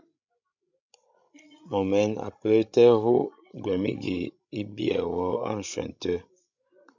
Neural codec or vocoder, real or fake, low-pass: codec, 16 kHz, 16 kbps, FreqCodec, larger model; fake; 7.2 kHz